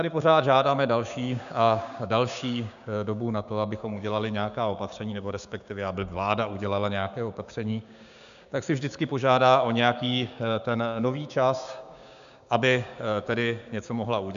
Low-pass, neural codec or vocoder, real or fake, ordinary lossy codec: 7.2 kHz; codec, 16 kHz, 6 kbps, DAC; fake; AAC, 96 kbps